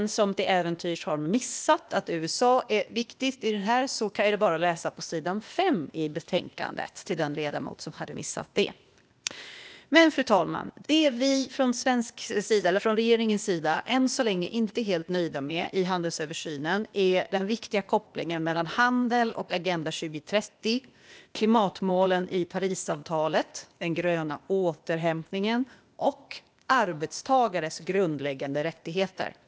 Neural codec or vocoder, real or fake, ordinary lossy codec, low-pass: codec, 16 kHz, 0.8 kbps, ZipCodec; fake; none; none